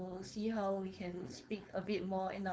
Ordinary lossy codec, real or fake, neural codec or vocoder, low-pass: none; fake; codec, 16 kHz, 4.8 kbps, FACodec; none